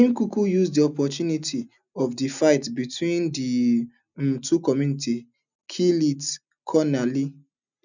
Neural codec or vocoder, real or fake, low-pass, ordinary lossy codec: none; real; 7.2 kHz; none